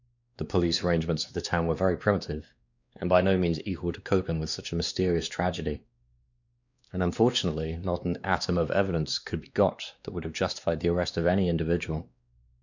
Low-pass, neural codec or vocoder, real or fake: 7.2 kHz; codec, 16 kHz, 2 kbps, X-Codec, WavLM features, trained on Multilingual LibriSpeech; fake